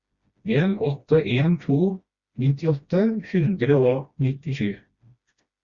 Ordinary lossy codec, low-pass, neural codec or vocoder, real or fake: Opus, 64 kbps; 7.2 kHz; codec, 16 kHz, 1 kbps, FreqCodec, smaller model; fake